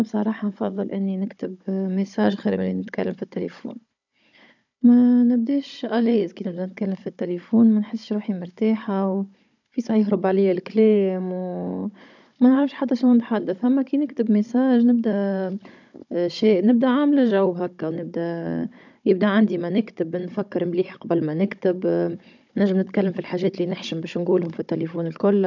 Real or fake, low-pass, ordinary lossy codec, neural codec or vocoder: fake; 7.2 kHz; none; codec, 16 kHz, 16 kbps, FunCodec, trained on Chinese and English, 50 frames a second